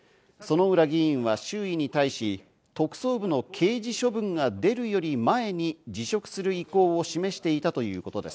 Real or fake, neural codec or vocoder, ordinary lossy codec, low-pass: real; none; none; none